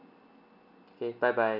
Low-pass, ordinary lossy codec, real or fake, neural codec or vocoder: 5.4 kHz; none; real; none